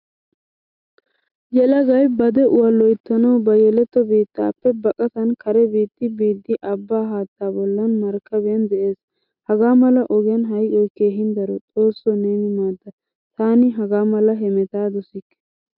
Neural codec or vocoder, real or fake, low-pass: none; real; 5.4 kHz